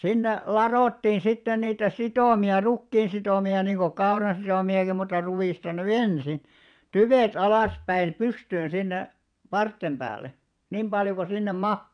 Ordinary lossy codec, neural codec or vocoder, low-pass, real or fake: none; vocoder, 22.05 kHz, 80 mel bands, WaveNeXt; 9.9 kHz; fake